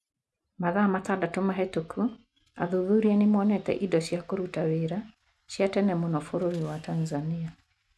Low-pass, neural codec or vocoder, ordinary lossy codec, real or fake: none; none; none; real